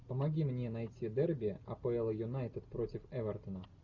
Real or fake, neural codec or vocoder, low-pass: real; none; 7.2 kHz